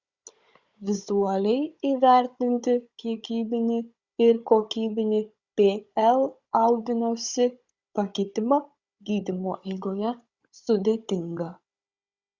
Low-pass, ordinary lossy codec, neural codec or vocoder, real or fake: 7.2 kHz; Opus, 64 kbps; codec, 16 kHz, 16 kbps, FunCodec, trained on Chinese and English, 50 frames a second; fake